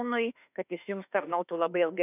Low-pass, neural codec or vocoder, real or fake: 3.6 kHz; autoencoder, 48 kHz, 32 numbers a frame, DAC-VAE, trained on Japanese speech; fake